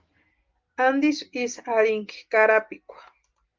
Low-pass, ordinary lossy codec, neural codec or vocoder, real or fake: 7.2 kHz; Opus, 32 kbps; none; real